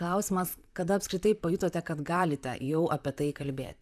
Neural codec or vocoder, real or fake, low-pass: vocoder, 44.1 kHz, 128 mel bands, Pupu-Vocoder; fake; 14.4 kHz